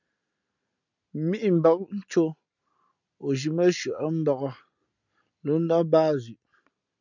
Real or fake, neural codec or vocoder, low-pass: real; none; 7.2 kHz